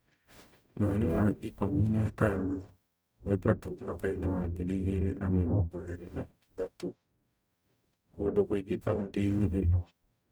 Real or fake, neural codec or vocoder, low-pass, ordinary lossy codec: fake; codec, 44.1 kHz, 0.9 kbps, DAC; none; none